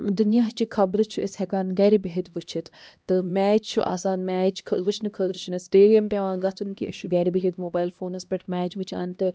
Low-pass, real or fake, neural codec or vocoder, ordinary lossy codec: none; fake; codec, 16 kHz, 1 kbps, X-Codec, HuBERT features, trained on LibriSpeech; none